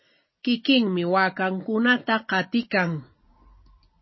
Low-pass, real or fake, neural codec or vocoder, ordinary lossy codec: 7.2 kHz; real; none; MP3, 24 kbps